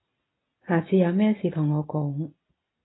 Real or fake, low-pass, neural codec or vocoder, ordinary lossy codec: real; 7.2 kHz; none; AAC, 16 kbps